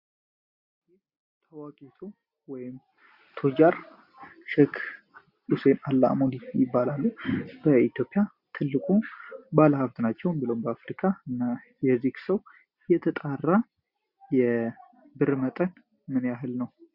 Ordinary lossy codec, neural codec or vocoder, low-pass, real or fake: Opus, 64 kbps; none; 5.4 kHz; real